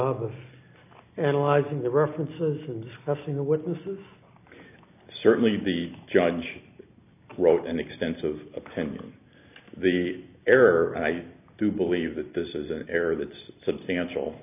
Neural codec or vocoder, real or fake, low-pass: none; real; 3.6 kHz